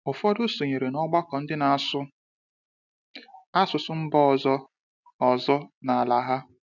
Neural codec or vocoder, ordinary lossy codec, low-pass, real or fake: none; none; 7.2 kHz; real